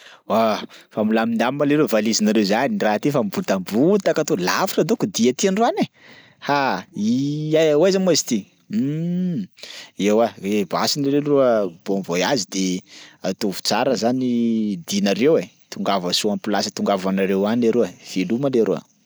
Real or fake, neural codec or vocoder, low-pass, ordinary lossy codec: real; none; none; none